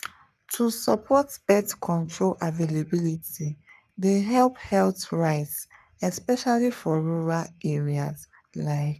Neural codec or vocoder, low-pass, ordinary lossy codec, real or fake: codec, 44.1 kHz, 3.4 kbps, Pupu-Codec; 14.4 kHz; none; fake